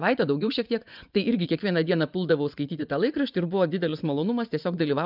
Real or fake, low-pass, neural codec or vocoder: fake; 5.4 kHz; vocoder, 24 kHz, 100 mel bands, Vocos